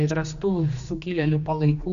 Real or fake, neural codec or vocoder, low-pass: fake; codec, 16 kHz, 2 kbps, X-Codec, HuBERT features, trained on general audio; 7.2 kHz